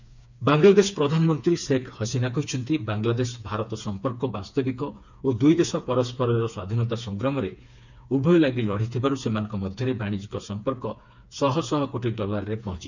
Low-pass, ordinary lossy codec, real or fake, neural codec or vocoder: 7.2 kHz; none; fake; codec, 16 kHz, 4 kbps, FreqCodec, smaller model